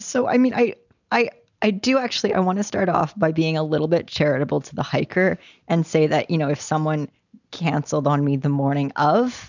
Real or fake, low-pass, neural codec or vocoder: real; 7.2 kHz; none